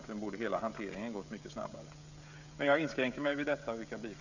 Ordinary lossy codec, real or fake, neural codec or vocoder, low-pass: none; fake; codec, 16 kHz, 16 kbps, FreqCodec, smaller model; 7.2 kHz